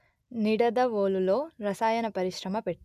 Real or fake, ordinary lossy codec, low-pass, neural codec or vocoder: real; none; 14.4 kHz; none